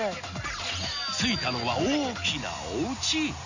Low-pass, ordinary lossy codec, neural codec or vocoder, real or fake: 7.2 kHz; none; none; real